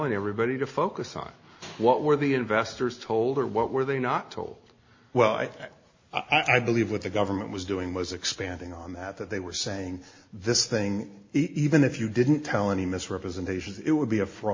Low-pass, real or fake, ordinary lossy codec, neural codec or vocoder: 7.2 kHz; real; MP3, 32 kbps; none